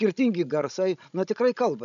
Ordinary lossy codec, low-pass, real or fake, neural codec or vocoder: MP3, 96 kbps; 7.2 kHz; fake; codec, 16 kHz, 16 kbps, FreqCodec, larger model